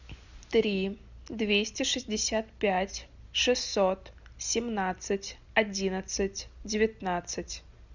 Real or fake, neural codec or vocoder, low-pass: real; none; 7.2 kHz